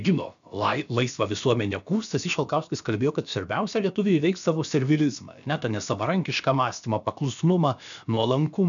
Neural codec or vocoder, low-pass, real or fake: codec, 16 kHz, about 1 kbps, DyCAST, with the encoder's durations; 7.2 kHz; fake